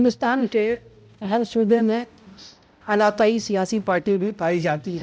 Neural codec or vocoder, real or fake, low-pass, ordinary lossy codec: codec, 16 kHz, 0.5 kbps, X-Codec, HuBERT features, trained on balanced general audio; fake; none; none